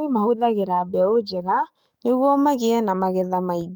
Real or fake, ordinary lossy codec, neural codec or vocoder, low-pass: fake; none; codec, 44.1 kHz, 7.8 kbps, DAC; none